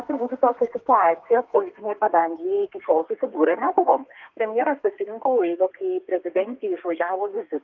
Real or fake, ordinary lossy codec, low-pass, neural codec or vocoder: fake; Opus, 24 kbps; 7.2 kHz; codec, 32 kHz, 1.9 kbps, SNAC